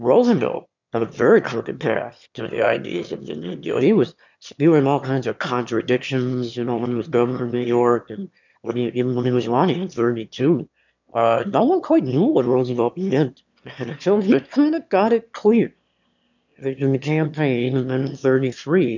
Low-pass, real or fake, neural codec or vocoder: 7.2 kHz; fake; autoencoder, 22.05 kHz, a latent of 192 numbers a frame, VITS, trained on one speaker